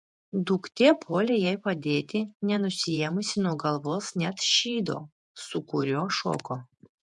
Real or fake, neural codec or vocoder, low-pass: fake; vocoder, 48 kHz, 128 mel bands, Vocos; 10.8 kHz